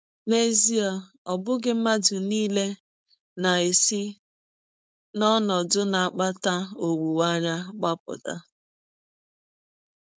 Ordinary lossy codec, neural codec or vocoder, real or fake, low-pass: none; codec, 16 kHz, 4.8 kbps, FACodec; fake; none